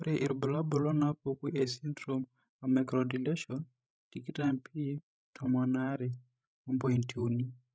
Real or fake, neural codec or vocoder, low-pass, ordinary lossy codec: fake; codec, 16 kHz, 16 kbps, FreqCodec, larger model; none; none